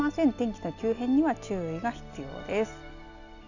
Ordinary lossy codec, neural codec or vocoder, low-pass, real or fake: none; none; 7.2 kHz; real